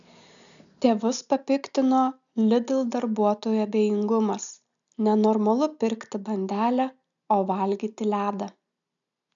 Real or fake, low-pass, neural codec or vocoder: real; 7.2 kHz; none